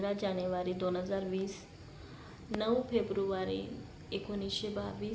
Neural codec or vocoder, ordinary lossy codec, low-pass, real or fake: none; none; none; real